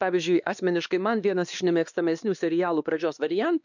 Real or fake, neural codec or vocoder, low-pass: fake; codec, 16 kHz, 2 kbps, X-Codec, WavLM features, trained on Multilingual LibriSpeech; 7.2 kHz